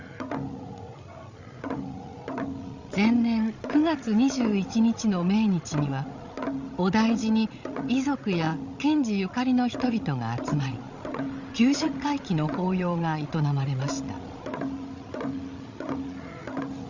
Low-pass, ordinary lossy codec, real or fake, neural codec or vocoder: 7.2 kHz; Opus, 64 kbps; fake; codec, 16 kHz, 16 kbps, FreqCodec, larger model